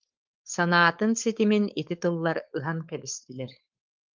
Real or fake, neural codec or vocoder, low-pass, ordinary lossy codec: fake; codec, 16 kHz, 4.8 kbps, FACodec; 7.2 kHz; Opus, 24 kbps